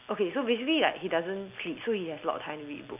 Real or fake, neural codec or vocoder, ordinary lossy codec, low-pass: real; none; none; 3.6 kHz